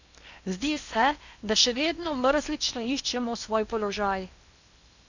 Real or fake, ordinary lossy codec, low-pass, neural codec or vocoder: fake; none; 7.2 kHz; codec, 16 kHz in and 24 kHz out, 0.8 kbps, FocalCodec, streaming, 65536 codes